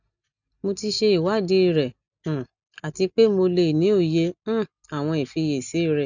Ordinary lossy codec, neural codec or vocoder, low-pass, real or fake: none; none; 7.2 kHz; real